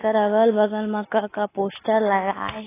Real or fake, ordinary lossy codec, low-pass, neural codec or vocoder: real; AAC, 16 kbps; 3.6 kHz; none